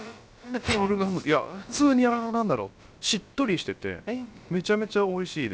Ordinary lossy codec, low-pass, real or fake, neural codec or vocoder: none; none; fake; codec, 16 kHz, about 1 kbps, DyCAST, with the encoder's durations